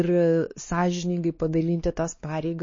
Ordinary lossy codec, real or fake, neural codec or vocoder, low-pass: MP3, 32 kbps; fake; codec, 16 kHz, 2 kbps, X-Codec, WavLM features, trained on Multilingual LibriSpeech; 7.2 kHz